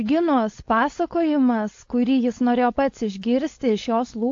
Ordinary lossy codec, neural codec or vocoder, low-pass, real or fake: AAC, 48 kbps; codec, 16 kHz, 4.8 kbps, FACodec; 7.2 kHz; fake